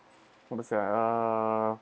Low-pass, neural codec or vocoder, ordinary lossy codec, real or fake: none; none; none; real